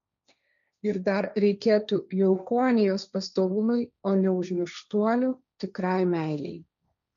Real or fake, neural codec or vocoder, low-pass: fake; codec, 16 kHz, 1.1 kbps, Voila-Tokenizer; 7.2 kHz